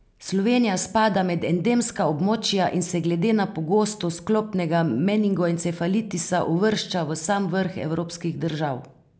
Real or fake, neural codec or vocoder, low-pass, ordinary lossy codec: real; none; none; none